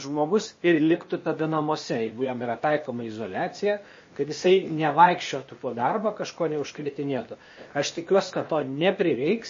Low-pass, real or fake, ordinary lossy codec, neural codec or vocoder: 7.2 kHz; fake; MP3, 32 kbps; codec, 16 kHz, 0.8 kbps, ZipCodec